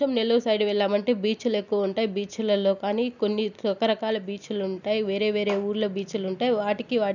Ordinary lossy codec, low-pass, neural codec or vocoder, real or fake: none; 7.2 kHz; none; real